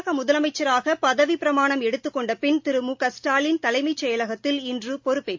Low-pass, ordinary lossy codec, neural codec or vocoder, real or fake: 7.2 kHz; MP3, 48 kbps; none; real